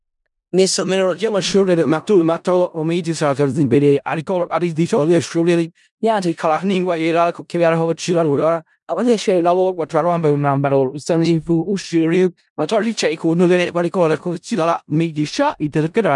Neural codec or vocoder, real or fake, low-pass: codec, 16 kHz in and 24 kHz out, 0.4 kbps, LongCat-Audio-Codec, four codebook decoder; fake; 10.8 kHz